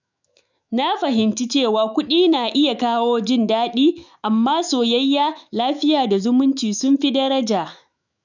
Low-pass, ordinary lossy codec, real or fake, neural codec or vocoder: 7.2 kHz; none; fake; autoencoder, 48 kHz, 128 numbers a frame, DAC-VAE, trained on Japanese speech